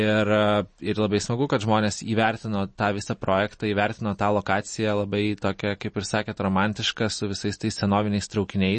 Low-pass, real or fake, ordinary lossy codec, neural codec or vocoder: 10.8 kHz; real; MP3, 32 kbps; none